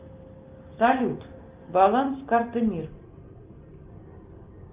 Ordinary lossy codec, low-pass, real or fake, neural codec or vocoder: Opus, 24 kbps; 3.6 kHz; real; none